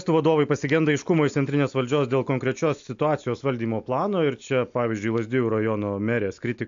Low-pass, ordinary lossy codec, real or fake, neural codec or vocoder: 7.2 kHz; AAC, 64 kbps; real; none